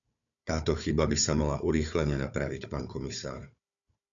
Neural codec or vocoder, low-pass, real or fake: codec, 16 kHz, 4 kbps, FunCodec, trained on Chinese and English, 50 frames a second; 7.2 kHz; fake